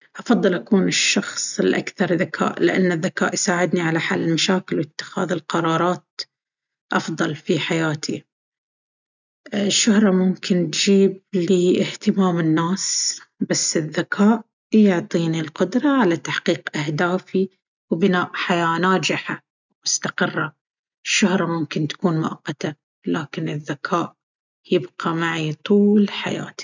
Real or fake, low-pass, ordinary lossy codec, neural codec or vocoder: real; 7.2 kHz; none; none